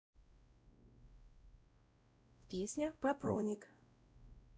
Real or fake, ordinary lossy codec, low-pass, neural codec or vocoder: fake; none; none; codec, 16 kHz, 0.5 kbps, X-Codec, WavLM features, trained on Multilingual LibriSpeech